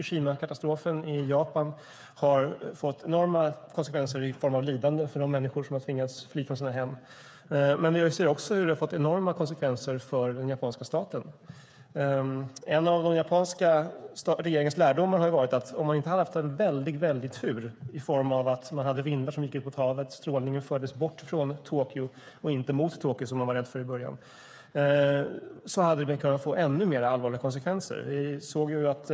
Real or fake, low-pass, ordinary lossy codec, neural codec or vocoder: fake; none; none; codec, 16 kHz, 8 kbps, FreqCodec, smaller model